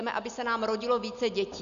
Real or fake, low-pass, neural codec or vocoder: real; 7.2 kHz; none